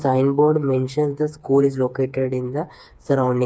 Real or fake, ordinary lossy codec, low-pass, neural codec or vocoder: fake; none; none; codec, 16 kHz, 4 kbps, FreqCodec, smaller model